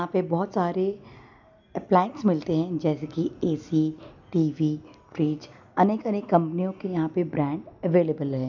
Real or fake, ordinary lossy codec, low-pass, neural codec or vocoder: real; none; 7.2 kHz; none